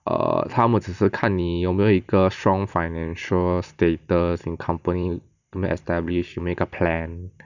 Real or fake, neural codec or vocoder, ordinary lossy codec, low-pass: real; none; none; 7.2 kHz